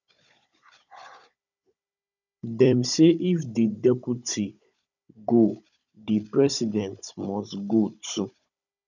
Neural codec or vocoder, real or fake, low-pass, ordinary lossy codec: codec, 16 kHz, 16 kbps, FunCodec, trained on Chinese and English, 50 frames a second; fake; 7.2 kHz; none